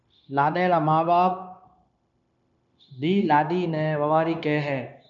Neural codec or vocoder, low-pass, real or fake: codec, 16 kHz, 0.9 kbps, LongCat-Audio-Codec; 7.2 kHz; fake